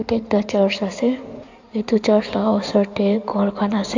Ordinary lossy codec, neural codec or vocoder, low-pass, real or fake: AAC, 48 kbps; codec, 16 kHz in and 24 kHz out, 2.2 kbps, FireRedTTS-2 codec; 7.2 kHz; fake